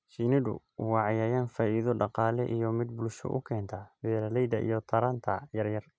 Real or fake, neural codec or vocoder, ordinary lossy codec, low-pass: real; none; none; none